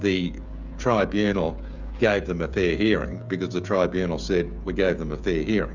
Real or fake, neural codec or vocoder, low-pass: fake; codec, 16 kHz, 16 kbps, FreqCodec, smaller model; 7.2 kHz